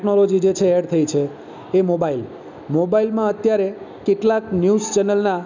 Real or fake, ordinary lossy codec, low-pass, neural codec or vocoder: real; none; 7.2 kHz; none